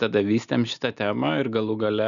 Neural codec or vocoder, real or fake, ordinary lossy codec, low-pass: none; real; MP3, 96 kbps; 7.2 kHz